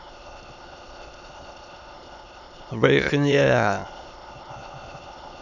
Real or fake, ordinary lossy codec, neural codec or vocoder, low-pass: fake; none; autoencoder, 22.05 kHz, a latent of 192 numbers a frame, VITS, trained on many speakers; 7.2 kHz